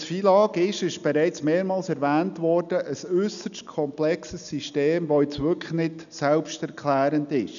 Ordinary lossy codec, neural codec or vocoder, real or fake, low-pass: none; none; real; 7.2 kHz